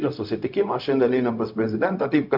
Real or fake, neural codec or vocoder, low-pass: fake; codec, 16 kHz, 0.4 kbps, LongCat-Audio-Codec; 5.4 kHz